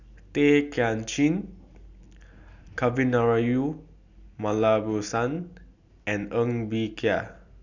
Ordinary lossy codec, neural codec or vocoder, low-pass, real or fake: none; none; 7.2 kHz; real